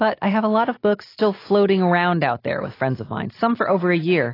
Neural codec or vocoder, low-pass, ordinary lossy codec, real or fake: none; 5.4 kHz; AAC, 24 kbps; real